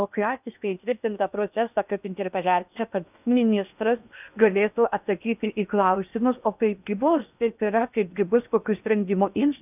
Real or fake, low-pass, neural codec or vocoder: fake; 3.6 kHz; codec, 16 kHz in and 24 kHz out, 0.8 kbps, FocalCodec, streaming, 65536 codes